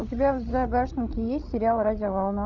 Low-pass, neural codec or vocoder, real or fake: 7.2 kHz; codec, 16 kHz, 16 kbps, FreqCodec, larger model; fake